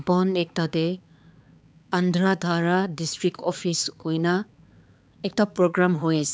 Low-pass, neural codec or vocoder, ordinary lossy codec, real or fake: none; codec, 16 kHz, 4 kbps, X-Codec, HuBERT features, trained on balanced general audio; none; fake